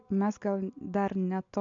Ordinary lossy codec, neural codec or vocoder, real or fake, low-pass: AAC, 64 kbps; none; real; 7.2 kHz